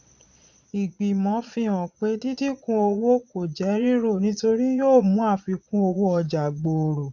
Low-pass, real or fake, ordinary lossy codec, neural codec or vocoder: 7.2 kHz; fake; none; vocoder, 22.05 kHz, 80 mel bands, Vocos